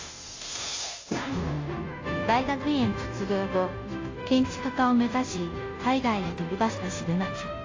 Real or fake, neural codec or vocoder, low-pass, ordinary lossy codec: fake; codec, 16 kHz, 0.5 kbps, FunCodec, trained on Chinese and English, 25 frames a second; 7.2 kHz; AAC, 32 kbps